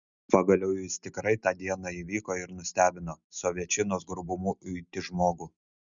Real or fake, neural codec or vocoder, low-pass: real; none; 7.2 kHz